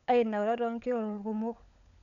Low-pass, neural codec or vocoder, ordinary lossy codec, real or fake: 7.2 kHz; codec, 16 kHz, 16 kbps, FunCodec, trained on LibriTTS, 50 frames a second; none; fake